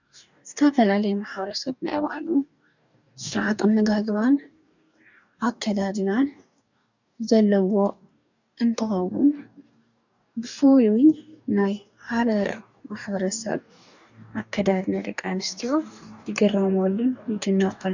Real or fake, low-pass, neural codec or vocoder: fake; 7.2 kHz; codec, 44.1 kHz, 2.6 kbps, DAC